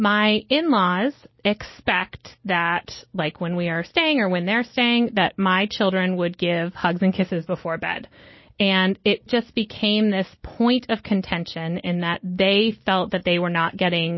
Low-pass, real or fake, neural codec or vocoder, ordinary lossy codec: 7.2 kHz; real; none; MP3, 24 kbps